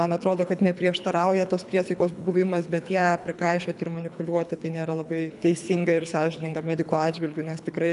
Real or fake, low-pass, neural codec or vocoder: fake; 10.8 kHz; codec, 24 kHz, 3 kbps, HILCodec